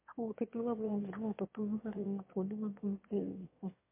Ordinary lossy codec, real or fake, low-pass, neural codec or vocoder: MP3, 24 kbps; fake; 3.6 kHz; autoencoder, 22.05 kHz, a latent of 192 numbers a frame, VITS, trained on one speaker